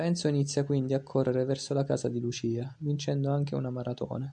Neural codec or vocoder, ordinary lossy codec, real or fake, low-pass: none; MP3, 64 kbps; real; 9.9 kHz